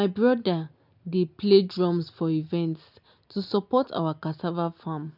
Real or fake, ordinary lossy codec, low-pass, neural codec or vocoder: real; none; 5.4 kHz; none